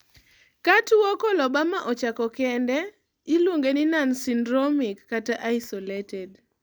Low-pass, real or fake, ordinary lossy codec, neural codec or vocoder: none; real; none; none